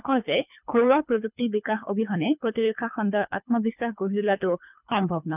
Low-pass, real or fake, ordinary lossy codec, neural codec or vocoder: 3.6 kHz; fake; none; codec, 24 kHz, 3 kbps, HILCodec